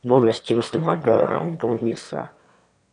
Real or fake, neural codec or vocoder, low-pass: fake; autoencoder, 22.05 kHz, a latent of 192 numbers a frame, VITS, trained on one speaker; 9.9 kHz